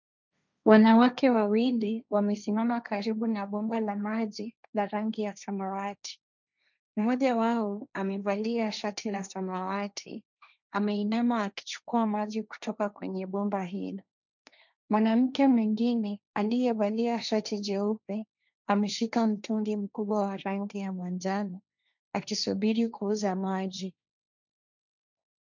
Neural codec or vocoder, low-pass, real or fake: codec, 16 kHz, 1.1 kbps, Voila-Tokenizer; 7.2 kHz; fake